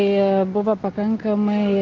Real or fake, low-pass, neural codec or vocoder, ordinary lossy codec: real; 7.2 kHz; none; Opus, 16 kbps